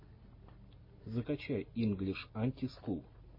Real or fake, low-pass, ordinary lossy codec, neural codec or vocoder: real; 5.4 kHz; MP3, 24 kbps; none